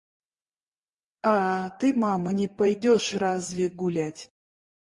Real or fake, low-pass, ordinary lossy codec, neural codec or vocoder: real; 10.8 kHz; Opus, 64 kbps; none